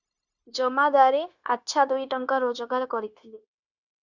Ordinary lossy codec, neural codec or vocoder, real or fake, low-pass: Opus, 64 kbps; codec, 16 kHz, 0.9 kbps, LongCat-Audio-Codec; fake; 7.2 kHz